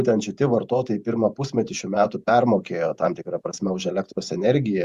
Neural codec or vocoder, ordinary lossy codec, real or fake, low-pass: vocoder, 48 kHz, 128 mel bands, Vocos; Opus, 64 kbps; fake; 14.4 kHz